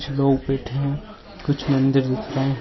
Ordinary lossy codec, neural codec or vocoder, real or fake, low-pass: MP3, 24 kbps; none; real; 7.2 kHz